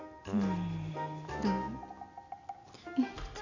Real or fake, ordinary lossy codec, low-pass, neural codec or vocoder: real; none; 7.2 kHz; none